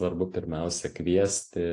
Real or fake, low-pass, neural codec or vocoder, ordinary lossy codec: real; 10.8 kHz; none; AAC, 48 kbps